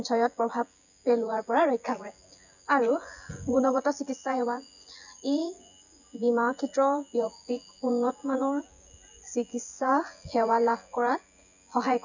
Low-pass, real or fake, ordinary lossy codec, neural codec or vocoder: 7.2 kHz; fake; none; vocoder, 24 kHz, 100 mel bands, Vocos